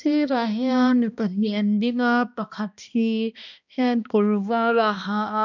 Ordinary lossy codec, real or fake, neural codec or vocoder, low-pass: none; fake; codec, 16 kHz, 1 kbps, X-Codec, HuBERT features, trained on balanced general audio; 7.2 kHz